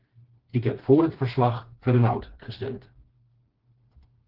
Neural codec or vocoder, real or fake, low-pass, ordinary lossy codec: codec, 16 kHz, 2 kbps, FreqCodec, smaller model; fake; 5.4 kHz; Opus, 16 kbps